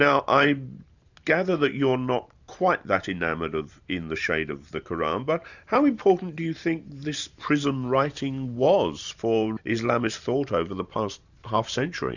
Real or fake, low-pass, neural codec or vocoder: fake; 7.2 kHz; vocoder, 44.1 kHz, 128 mel bands every 512 samples, BigVGAN v2